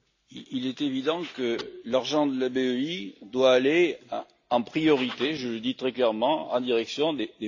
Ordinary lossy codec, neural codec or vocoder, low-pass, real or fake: AAC, 48 kbps; none; 7.2 kHz; real